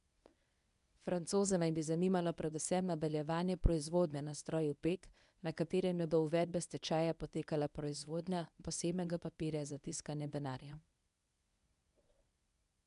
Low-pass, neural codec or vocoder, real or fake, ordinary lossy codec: 10.8 kHz; codec, 24 kHz, 0.9 kbps, WavTokenizer, medium speech release version 1; fake; none